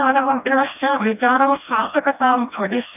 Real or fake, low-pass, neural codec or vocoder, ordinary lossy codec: fake; 3.6 kHz; codec, 16 kHz, 1 kbps, FreqCodec, smaller model; none